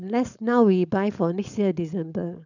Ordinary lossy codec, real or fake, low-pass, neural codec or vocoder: none; fake; 7.2 kHz; codec, 16 kHz, 4.8 kbps, FACodec